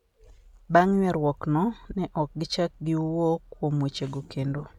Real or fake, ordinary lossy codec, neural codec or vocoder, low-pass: real; none; none; 19.8 kHz